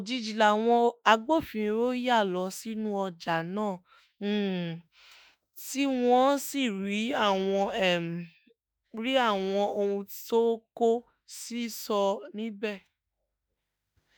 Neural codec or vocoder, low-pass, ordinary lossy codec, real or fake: autoencoder, 48 kHz, 32 numbers a frame, DAC-VAE, trained on Japanese speech; none; none; fake